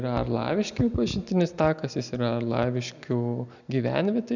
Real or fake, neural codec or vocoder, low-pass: real; none; 7.2 kHz